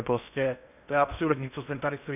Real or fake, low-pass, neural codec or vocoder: fake; 3.6 kHz; codec, 16 kHz in and 24 kHz out, 0.6 kbps, FocalCodec, streaming, 4096 codes